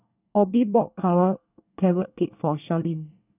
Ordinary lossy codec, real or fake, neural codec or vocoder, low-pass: none; fake; codec, 24 kHz, 1 kbps, SNAC; 3.6 kHz